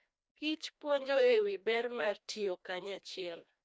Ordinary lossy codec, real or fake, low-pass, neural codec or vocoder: none; fake; none; codec, 16 kHz, 1 kbps, FreqCodec, larger model